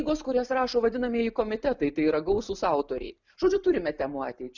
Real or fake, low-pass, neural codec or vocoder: real; 7.2 kHz; none